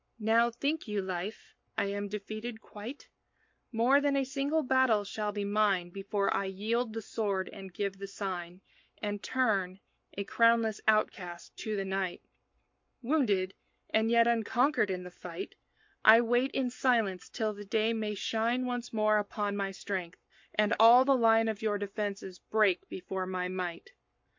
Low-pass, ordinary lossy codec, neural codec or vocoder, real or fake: 7.2 kHz; MP3, 48 kbps; codec, 44.1 kHz, 7.8 kbps, Pupu-Codec; fake